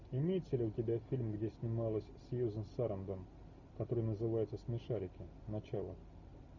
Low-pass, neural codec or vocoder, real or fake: 7.2 kHz; none; real